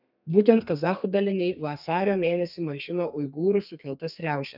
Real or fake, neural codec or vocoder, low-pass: fake; codec, 32 kHz, 1.9 kbps, SNAC; 5.4 kHz